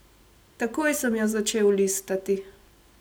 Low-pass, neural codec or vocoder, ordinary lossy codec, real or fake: none; none; none; real